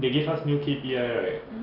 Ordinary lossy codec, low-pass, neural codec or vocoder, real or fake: none; 5.4 kHz; none; real